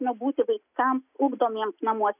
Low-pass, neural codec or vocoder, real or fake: 3.6 kHz; none; real